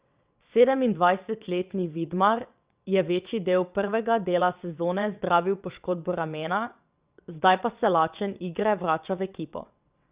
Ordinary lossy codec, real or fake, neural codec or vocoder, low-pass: Opus, 64 kbps; fake; vocoder, 22.05 kHz, 80 mel bands, WaveNeXt; 3.6 kHz